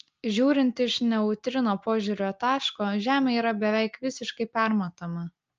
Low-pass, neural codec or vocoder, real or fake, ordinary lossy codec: 7.2 kHz; none; real; Opus, 24 kbps